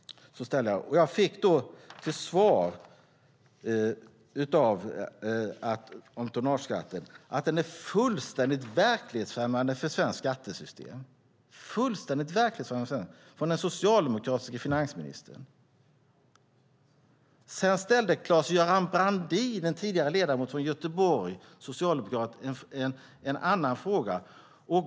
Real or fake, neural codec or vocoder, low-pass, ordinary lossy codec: real; none; none; none